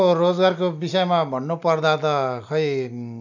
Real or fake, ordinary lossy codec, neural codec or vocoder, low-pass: real; none; none; 7.2 kHz